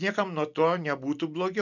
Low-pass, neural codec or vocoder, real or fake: 7.2 kHz; none; real